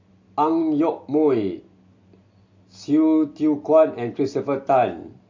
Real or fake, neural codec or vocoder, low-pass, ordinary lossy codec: real; none; 7.2 kHz; MP3, 48 kbps